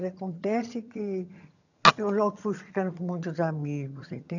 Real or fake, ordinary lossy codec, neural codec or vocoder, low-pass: fake; none; vocoder, 22.05 kHz, 80 mel bands, HiFi-GAN; 7.2 kHz